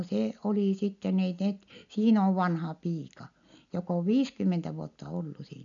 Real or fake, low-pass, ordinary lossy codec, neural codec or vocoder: real; 7.2 kHz; none; none